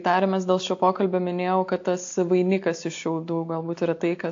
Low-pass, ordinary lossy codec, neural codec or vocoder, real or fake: 7.2 kHz; AAC, 48 kbps; none; real